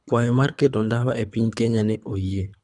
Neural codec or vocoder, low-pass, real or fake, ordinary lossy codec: codec, 24 kHz, 3 kbps, HILCodec; 10.8 kHz; fake; none